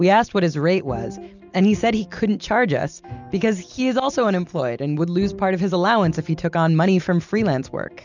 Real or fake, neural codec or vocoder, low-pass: real; none; 7.2 kHz